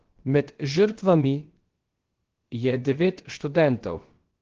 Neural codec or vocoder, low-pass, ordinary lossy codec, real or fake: codec, 16 kHz, about 1 kbps, DyCAST, with the encoder's durations; 7.2 kHz; Opus, 16 kbps; fake